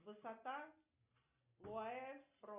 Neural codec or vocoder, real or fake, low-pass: none; real; 3.6 kHz